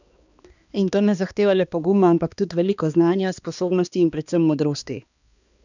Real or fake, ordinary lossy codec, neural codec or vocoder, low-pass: fake; none; codec, 16 kHz, 2 kbps, X-Codec, HuBERT features, trained on balanced general audio; 7.2 kHz